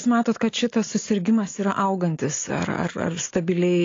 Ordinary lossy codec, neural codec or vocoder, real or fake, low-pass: AAC, 32 kbps; none; real; 7.2 kHz